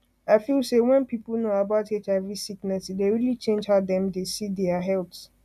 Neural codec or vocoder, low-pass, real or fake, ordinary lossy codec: none; 14.4 kHz; real; none